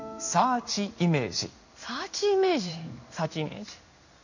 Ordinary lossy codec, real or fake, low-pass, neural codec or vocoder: none; fake; 7.2 kHz; codec, 16 kHz in and 24 kHz out, 1 kbps, XY-Tokenizer